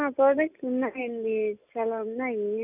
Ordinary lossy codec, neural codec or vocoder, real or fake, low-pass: none; none; real; 3.6 kHz